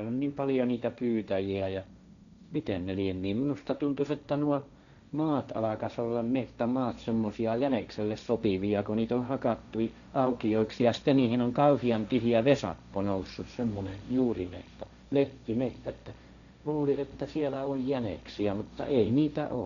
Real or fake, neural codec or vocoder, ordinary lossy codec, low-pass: fake; codec, 16 kHz, 1.1 kbps, Voila-Tokenizer; none; 7.2 kHz